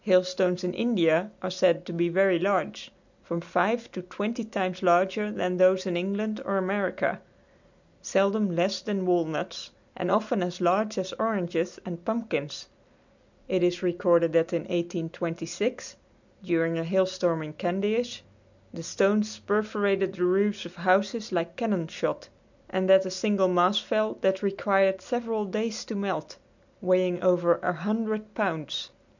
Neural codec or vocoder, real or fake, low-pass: none; real; 7.2 kHz